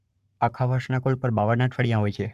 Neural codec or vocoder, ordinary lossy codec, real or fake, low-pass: codec, 44.1 kHz, 7.8 kbps, Pupu-Codec; AAC, 96 kbps; fake; 14.4 kHz